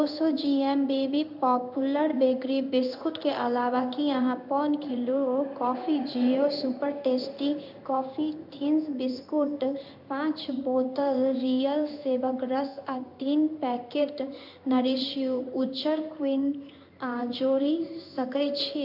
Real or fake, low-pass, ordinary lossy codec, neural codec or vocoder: fake; 5.4 kHz; none; codec, 16 kHz in and 24 kHz out, 1 kbps, XY-Tokenizer